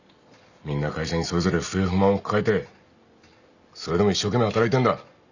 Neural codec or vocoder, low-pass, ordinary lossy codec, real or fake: none; 7.2 kHz; none; real